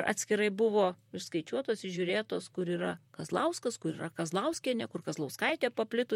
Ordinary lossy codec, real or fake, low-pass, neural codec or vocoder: MP3, 64 kbps; fake; 19.8 kHz; vocoder, 44.1 kHz, 128 mel bands, Pupu-Vocoder